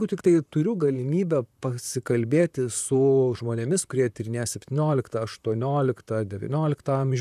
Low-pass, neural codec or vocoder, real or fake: 14.4 kHz; vocoder, 44.1 kHz, 128 mel bands, Pupu-Vocoder; fake